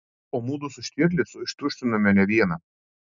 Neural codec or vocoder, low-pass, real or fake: none; 7.2 kHz; real